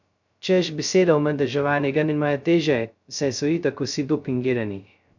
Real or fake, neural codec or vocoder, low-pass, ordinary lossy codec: fake; codec, 16 kHz, 0.2 kbps, FocalCodec; 7.2 kHz; none